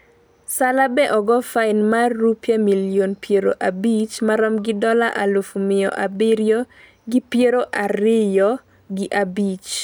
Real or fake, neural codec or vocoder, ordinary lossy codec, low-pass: real; none; none; none